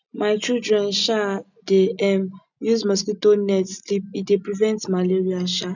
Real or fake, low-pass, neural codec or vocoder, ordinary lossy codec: real; 7.2 kHz; none; none